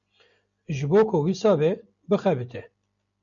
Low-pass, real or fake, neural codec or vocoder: 7.2 kHz; real; none